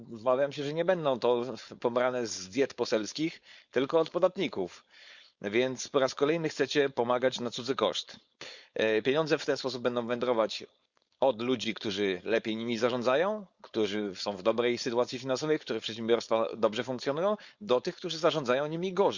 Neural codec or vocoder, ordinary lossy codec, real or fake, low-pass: codec, 16 kHz, 4.8 kbps, FACodec; Opus, 64 kbps; fake; 7.2 kHz